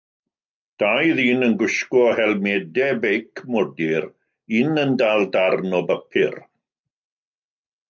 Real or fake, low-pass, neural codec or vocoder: real; 7.2 kHz; none